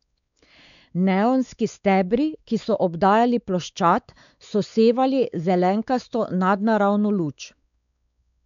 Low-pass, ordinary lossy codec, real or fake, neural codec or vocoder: 7.2 kHz; none; fake; codec, 16 kHz, 4 kbps, X-Codec, WavLM features, trained on Multilingual LibriSpeech